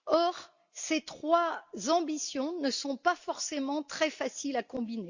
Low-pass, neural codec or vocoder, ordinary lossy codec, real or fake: 7.2 kHz; none; Opus, 64 kbps; real